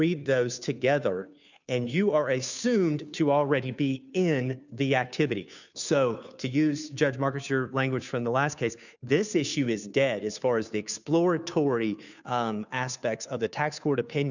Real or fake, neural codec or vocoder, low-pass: fake; codec, 16 kHz, 2 kbps, FunCodec, trained on Chinese and English, 25 frames a second; 7.2 kHz